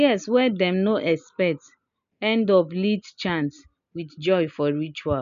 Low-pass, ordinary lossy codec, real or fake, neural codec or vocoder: 7.2 kHz; MP3, 96 kbps; real; none